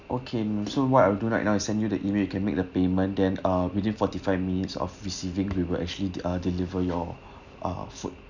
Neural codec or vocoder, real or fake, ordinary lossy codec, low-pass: none; real; none; 7.2 kHz